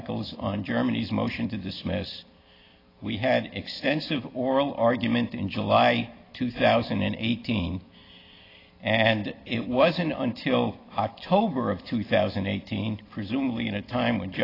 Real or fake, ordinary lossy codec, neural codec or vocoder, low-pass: real; AAC, 24 kbps; none; 5.4 kHz